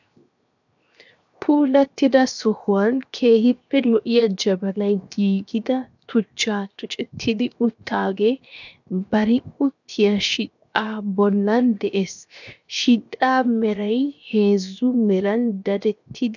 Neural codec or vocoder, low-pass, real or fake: codec, 16 kHz, 0.7 kbps, FocalCodec; 7.2 kHz; fake